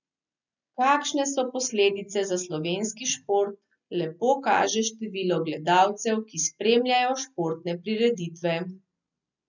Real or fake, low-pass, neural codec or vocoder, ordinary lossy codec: real; 7.2 kHz; none; none